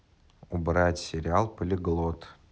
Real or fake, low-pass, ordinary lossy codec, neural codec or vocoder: real; none; none; none